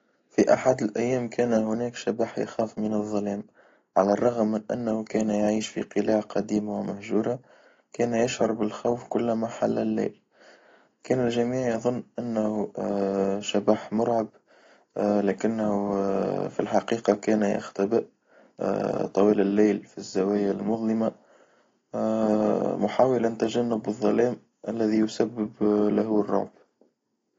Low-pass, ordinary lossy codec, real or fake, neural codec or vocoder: 7.2 kHz; AAC, 32 kbps; real; none